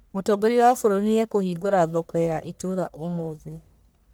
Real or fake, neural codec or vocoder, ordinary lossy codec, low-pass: fake; codec, 44.1 kHz, 1.7 kbps, Pupu-Codec; none; none